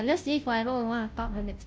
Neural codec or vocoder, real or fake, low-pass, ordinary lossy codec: codec, 16 kHz, 0.5 kbps, FunCodec, trained on Chinese and English, 25 frames a second; fake; none; none